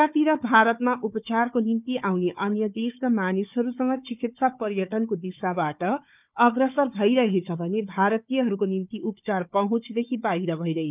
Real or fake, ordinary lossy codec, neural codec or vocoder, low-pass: fake; none; codec, 16 kHz, 4 kbps, FunCodec, trained on Chinese and English, 50 frames a second; 3.6 kHz